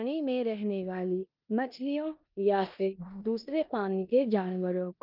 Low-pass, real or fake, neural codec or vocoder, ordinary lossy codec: 5.4 kHz; fake; codec, 16 kHz in and 24 kHz out, 0.9 kbps, LongCat-Audio-Codec, four codebook decoder; Opus, 24 kbps